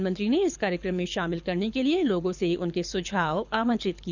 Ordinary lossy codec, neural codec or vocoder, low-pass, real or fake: none; codec, 24 kHz, 6 kbps, HILCodec; 7.2 kHz; fake